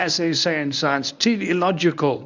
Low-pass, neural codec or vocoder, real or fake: 7.2 kHz; codec, 24 kHz, 0.9 kbps, WavTokenizer, medium speech release version 1; fake